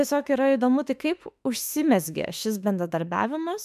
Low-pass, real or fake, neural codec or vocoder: 14.4 kHz; fake; autoencoder, 48 kHz, 32 numbers a frame, DAC-VAE, trained on Japanese speech